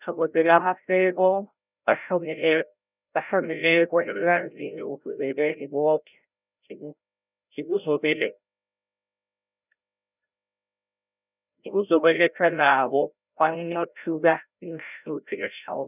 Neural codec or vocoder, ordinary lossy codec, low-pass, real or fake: codec, 16 kHz, 0.5 kbps, FreqCodec, larger model; none; 3.6 kHz; fake